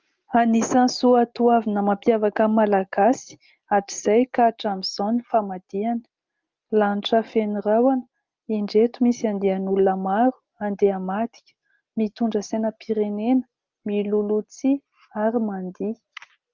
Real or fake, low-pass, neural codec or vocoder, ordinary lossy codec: real; 7.2 kHz; none; Opus, 24 kbps